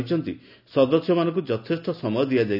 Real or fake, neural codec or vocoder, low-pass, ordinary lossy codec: real; none; 5.4 kHz; none